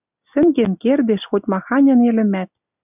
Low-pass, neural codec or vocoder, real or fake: 3.6 kHz; none; real